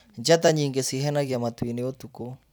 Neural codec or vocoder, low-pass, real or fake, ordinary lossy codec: vocoder, 44.1 kHz, 128 mel bands every 256 samples, BigVGAN v2; none; fake; none